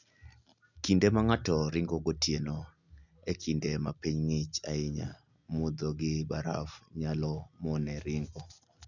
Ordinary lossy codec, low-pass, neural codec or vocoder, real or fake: none; 7.2 kHz; none; real